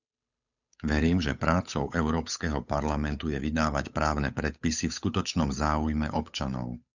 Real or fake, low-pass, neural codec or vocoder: fake; 7.2 kHz; codec, 16 kHz, 8 kbps, FunCodec, trained on Chinese and English, 25 frames a second